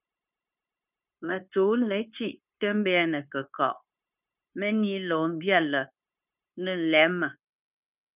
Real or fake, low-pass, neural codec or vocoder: fake; 3.6 kHz; codec, 16 kHz, 0.9 kbps, LongCat-Audio-Codec